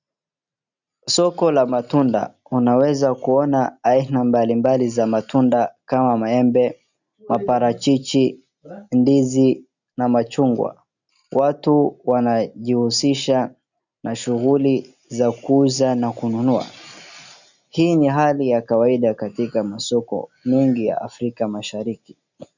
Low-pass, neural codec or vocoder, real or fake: 7.2 kHz; none; real